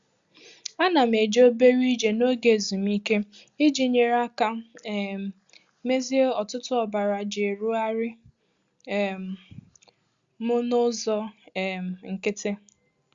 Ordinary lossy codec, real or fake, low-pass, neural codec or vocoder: Opus, 64 kbps; real; 7.2 kHz; none